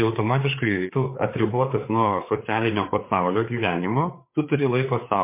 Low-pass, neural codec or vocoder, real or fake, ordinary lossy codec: 3.6 kHz; codec, 16 kHz, 4 kbps, FreqCodec, larger model; fake; MP3, 24 kbps